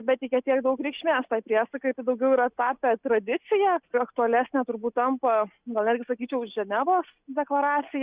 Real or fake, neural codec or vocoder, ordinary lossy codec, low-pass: real; none; Opus, 64 kbps; 3.6 kHz